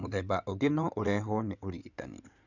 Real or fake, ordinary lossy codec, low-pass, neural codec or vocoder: fake; none; 7.2 kHz; codec, 16 kHz in and 24 kHz out, 2.2 kbps, FireRedTTS-2 codec